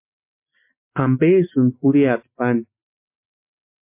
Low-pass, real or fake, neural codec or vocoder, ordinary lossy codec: 3.6 kHz; real; none; MP3, 32 kbps